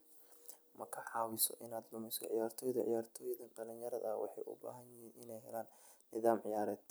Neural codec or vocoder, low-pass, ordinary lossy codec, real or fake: none; none; none; real